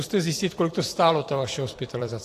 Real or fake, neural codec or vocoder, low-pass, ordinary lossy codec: fake; vocoder, 44.1 kHz, 128 mel bands every 256 samples, BigVGAN v2; 14.4 kHz; AAC, 48 kbps